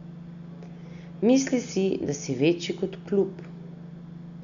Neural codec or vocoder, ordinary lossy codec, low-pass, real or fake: none; none; 7.2 kHz; real